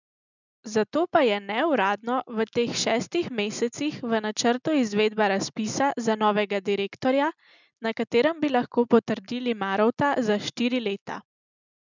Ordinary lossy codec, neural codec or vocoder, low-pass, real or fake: none; none; 7.2 kHz; real